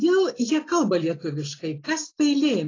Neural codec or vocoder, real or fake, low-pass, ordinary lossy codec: none; real; 7.2 kHz; AAC, 32 kbps